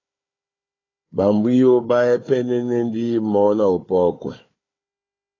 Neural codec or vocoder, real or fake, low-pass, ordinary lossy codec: codec, 16 kHz, 4 kbps, FunCodec, trained on Chinese and English, 50 frames a second; fake; 7.2 kHz; AAC, 32 kbps